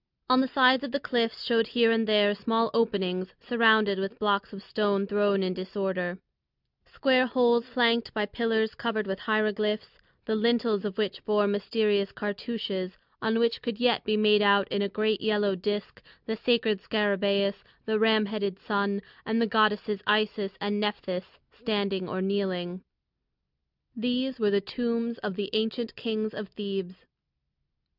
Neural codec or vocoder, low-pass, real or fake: none; 5.4 kHz; real